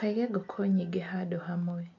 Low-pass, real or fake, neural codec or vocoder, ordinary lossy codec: 7.2 kHz; real; none; none